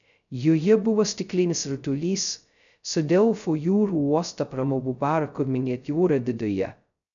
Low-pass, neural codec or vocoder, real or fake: 7.2 kHz; codec, 16 kHz, 0.2 kbps, FocalCodec; fake